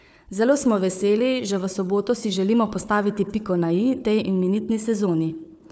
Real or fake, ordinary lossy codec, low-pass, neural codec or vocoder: fake; none; none; codec, 16 kHz, 4 kbps, FunCodec, trained on Chinese and English, 50 frames a second